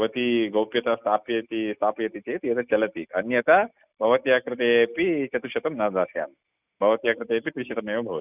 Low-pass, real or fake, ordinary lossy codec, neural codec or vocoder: 3.6 kHz; real; none; none